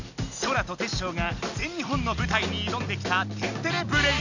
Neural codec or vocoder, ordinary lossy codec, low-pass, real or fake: none; none; 7.2 kHz; real